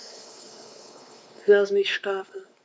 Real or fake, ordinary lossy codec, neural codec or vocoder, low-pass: fake; none; codec, 16 kHz, 2 kbps, X-Codec, WavLM features, trained on Multilingual LibriSpeech; none